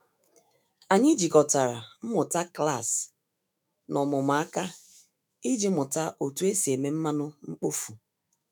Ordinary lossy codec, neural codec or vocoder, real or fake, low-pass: none; autoencoder, 48 kHz, 128 numbers a frame, DAC-VAE, trained on Japanese speech; fake; none